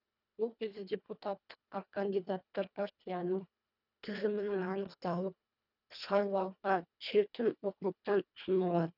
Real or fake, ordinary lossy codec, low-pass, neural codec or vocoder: fake; none; 5.4 kHz; codec, 24 kHz, 1.5 kbps, HILCodec